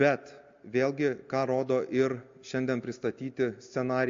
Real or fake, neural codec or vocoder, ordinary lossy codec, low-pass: real; none; AAC, 48 kbps; 7.2 kHz